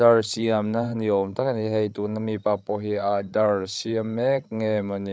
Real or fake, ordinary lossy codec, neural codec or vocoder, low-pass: fake; none; codec, 16 kHz, 16 kbps, FreqCodec, larger model; none